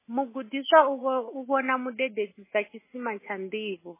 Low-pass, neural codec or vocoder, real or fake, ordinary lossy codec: 3.6 kHz; none; real; MP3, 16 kbps